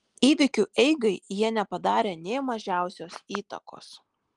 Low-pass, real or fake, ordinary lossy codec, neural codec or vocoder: 10.8 kHz; real; Opus, 24 kbps; none